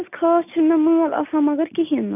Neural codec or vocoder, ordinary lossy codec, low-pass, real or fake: none; none; 3.6 kHz; real